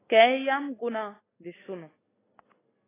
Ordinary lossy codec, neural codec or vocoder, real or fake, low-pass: AAC, 16 kbps; none; real; 3.6 kHz